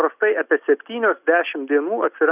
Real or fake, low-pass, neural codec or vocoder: real; 3.6 kHz; none